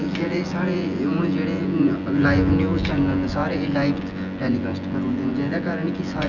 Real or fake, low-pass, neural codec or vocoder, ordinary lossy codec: fake; 7.2 kHz; vocoder, 24 kHz, 100 mel bands, Vocos; none